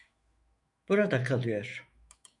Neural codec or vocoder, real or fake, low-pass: autoencoder, 48 kHz, 128 numbers a frame, DAC-VAE, trained on Japanese speech; fake; 10.8 kHz